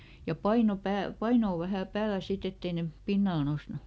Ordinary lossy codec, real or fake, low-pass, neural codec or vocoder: none; real; none; none